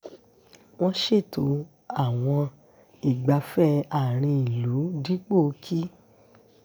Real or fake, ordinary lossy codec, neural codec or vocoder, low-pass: real; none; none; none